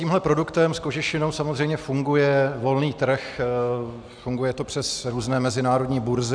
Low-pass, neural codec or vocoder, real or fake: 9.9 kHz; none; real